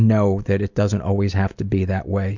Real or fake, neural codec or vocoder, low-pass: real; none; 7.2 kHz